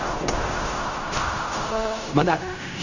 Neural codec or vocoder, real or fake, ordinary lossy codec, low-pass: codec, 16 kHz in and 24 kHz out, 0.4 kbps, LongCat-Audio-Codec, fine tuned four codebook decoder; fake; none; 7.2 kHz